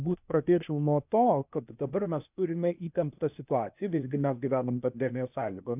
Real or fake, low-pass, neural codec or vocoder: fake; 3.6 kHz; codec, 16 kHz, 0.8 kbps, ZipCodec